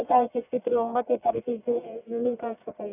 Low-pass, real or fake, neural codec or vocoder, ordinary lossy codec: 3.6 kHz; fake; codec, 44.1 kHz, 1.7 kbps, Pupu-Codec; none